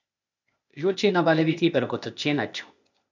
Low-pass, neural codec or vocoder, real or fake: 7.2 kHz; codec, 16 kHz, 0.8 kbps, ZipCodec; fake